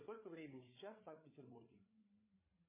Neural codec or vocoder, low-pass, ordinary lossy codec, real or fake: codec, 16 kHz, 8 kbps, FreqCodec, larger model; 3.6 kHz; MP3, 16 kbps; fake